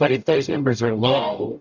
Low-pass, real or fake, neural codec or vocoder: 7.2 kHz; fake; codec, 44.1 kHz, 0.9 kbps, DAC